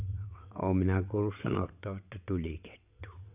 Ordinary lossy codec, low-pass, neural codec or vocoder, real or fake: none; 3.6 kHz; vocoder, 22.05 kHz, 80 mel bands, WaveNeXt; fake